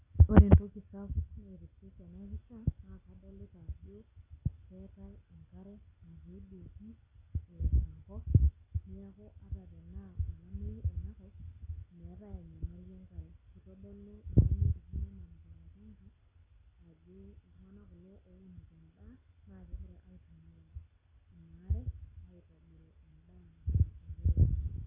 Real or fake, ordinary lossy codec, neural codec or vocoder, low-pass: real; none; none; 3.6 kHz